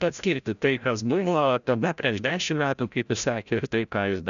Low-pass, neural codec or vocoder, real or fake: 7.2 kHz; codec, 16 kHz, 0.5 kbps, FreqCodec, larger model; fake